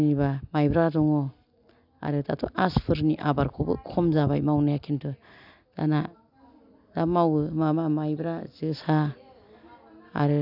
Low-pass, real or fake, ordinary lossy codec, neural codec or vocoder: 5.4 kHz; real; none; none